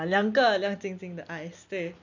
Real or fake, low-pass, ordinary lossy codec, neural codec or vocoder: real; 7.2 kHz; none; none